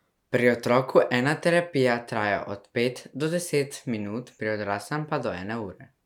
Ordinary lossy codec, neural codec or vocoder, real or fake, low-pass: none; none; real; 19.8 kHz